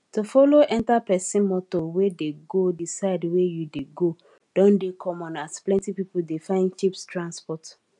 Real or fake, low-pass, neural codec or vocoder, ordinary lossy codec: real; 10.8 kHz; none; none